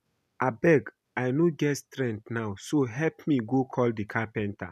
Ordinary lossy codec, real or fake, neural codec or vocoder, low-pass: none; real; none; 14.4 kHz